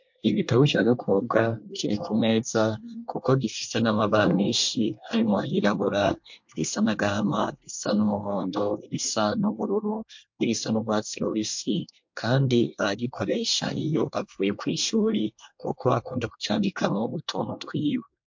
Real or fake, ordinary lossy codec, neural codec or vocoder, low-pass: fake; MP3, 48 kbps; codec, 24 kHz, 1 kbps, SNAC; 7.2 kHz